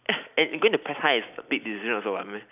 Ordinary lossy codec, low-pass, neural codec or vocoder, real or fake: none; 3.6 kHz; none; real